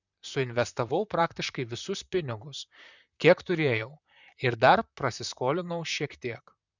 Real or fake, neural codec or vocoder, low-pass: fake; vocoder, 44.1 kHz, 128 mel bands, Pupu-Vocoder; 7.2 kHz